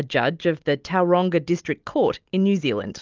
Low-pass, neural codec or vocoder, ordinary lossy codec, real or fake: 7.2 kHz; none; Opus, 32 kbps; real